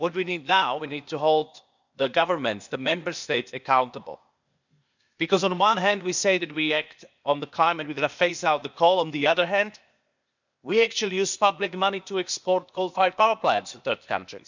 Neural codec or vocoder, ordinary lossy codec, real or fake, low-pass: codec, 16 kHz, 0.8 kbps, ZipCodec; none; fake; 7.2 kHz